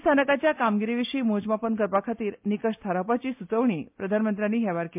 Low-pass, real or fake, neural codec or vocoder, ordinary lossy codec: 3.6 kHz; real; none; MP3, 32 kbps